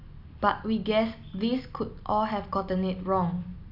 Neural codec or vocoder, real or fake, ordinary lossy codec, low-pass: none; real; none; 5.4 kHz